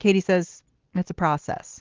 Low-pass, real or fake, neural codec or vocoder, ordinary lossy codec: 7.2 kHz; real; none; Opus, 16 kbps